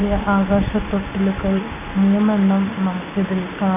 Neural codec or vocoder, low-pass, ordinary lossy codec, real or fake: none; 3.6 kHz; none; real